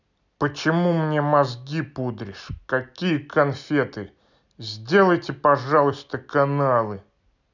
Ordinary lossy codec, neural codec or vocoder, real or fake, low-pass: none; none; real; 7.2 kHz